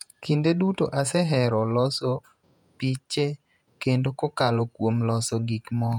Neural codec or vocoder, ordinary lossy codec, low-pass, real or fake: vocoder, 48 kHz, 128 mel bands, Vocos; none; 19.8 kHz; fake